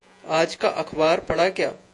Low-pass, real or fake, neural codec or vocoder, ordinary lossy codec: 10.8 kHz; fake; vocoder, 48 kHz, 128 mel bands, Vocos; AAC, 64 kbps